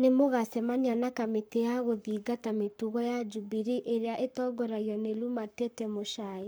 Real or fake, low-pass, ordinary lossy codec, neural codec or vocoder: fake; none; none; codec, 44.1 kHz, 7.8 kbps, Pupu-Codec